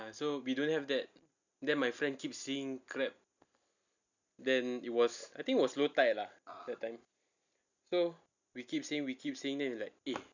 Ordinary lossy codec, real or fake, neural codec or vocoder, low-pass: none; real; none; 7.2 kHz